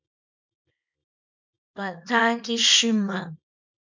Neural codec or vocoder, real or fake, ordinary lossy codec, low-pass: codec, 24 kHz, 0.9 kbps, WavTokenizer, small release; fake; MP3, 64 kbps; 7.2 kHz